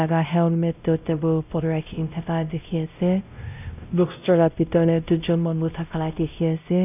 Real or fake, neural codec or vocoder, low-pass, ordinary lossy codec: fake; codec, 16 kHz, 0.5 kbps, X-Codec, WavLM features, trained on Multilingual LibriSpeech; 3.6 kHz; none